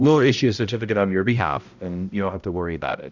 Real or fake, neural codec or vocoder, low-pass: fake; codec, 16 kHz, 0.5 kbps, X-Codec, HuBERT features, trained on balanced general audio; 7.2 kHz